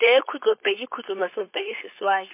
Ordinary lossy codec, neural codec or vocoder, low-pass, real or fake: MP3, 24 kbps; codec, 16 kHz, 4.8 kbps, FACodec; 3.6 kHz; fake